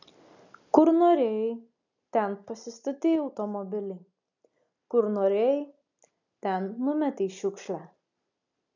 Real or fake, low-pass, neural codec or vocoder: real; 7.2 kHz; none